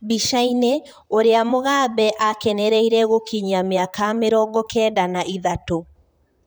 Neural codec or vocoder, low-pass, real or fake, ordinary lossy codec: vocoder, 44.1 kHz, 128 mel bands, Pupu-Vocoder; none; fake; none